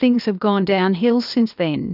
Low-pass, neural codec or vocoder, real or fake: 5.4 kHz; codec, 16 kHz, 0.8 kbps, ZipCodec; fake